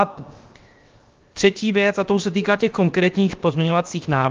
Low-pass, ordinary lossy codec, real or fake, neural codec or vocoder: 7.2 kHz; Opus, 24 kbps; fake; codec, 16 kHz, 0.7 kbps, FocalCodec